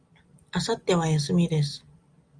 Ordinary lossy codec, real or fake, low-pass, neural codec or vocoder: Opus, 32 kbps; real; 9.9 kHz; none